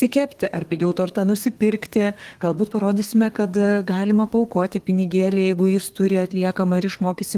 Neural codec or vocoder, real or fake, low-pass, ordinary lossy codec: codec, 32 kHz, 1.9 kbps, SNAC; fake; 14.4 kHz; Opus, 24 kbps